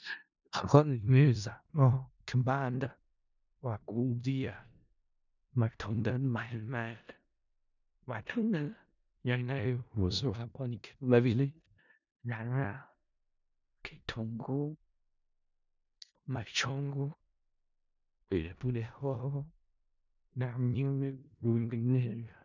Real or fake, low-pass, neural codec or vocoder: fake; 7.2 kHz; codec, 16 kHz in and 24 kHz out, 0.4 kbps, LongCat-Audio-Codec, four codebook decoder